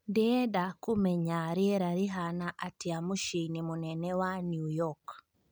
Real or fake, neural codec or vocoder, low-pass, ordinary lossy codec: real; none; none; none